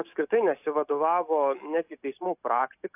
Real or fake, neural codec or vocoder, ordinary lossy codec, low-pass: real; none; AAC, 32 kbps; 3.6 kHz